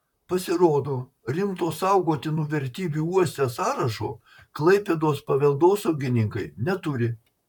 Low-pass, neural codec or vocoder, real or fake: 19.8 kHz; vocoder, 44.1 kHz, 128 mel bands, Pupu-Vocoder; fake